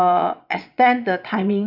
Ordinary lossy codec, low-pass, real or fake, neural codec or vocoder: none; 5.4 kHz; fake; vocoder, 22.05 kHz, 80 mel bands, Vocos